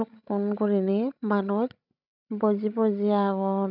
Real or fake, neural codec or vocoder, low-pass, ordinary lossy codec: fake; codec, 16 kHz, 16 kbps, FreqCodec, larger model; 5.4 kHz; none